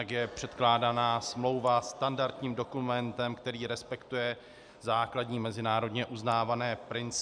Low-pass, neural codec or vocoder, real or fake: 9.9 kHz; none; real